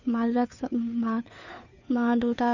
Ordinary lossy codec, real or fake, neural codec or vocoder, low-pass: none; fake; codec, 16 kHz, 2 kbps, FunCodec, trained on Chinese and English, 25 frames a second; 7.2 kHz